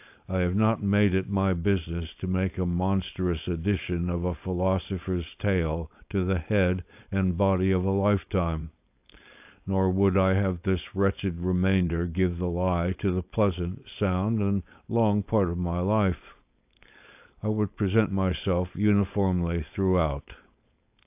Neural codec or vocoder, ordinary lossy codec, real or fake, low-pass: codec, 16 kHz, 4.8 kbps, FACodec; AAC, 32 kbps; fake; 3.6 kHz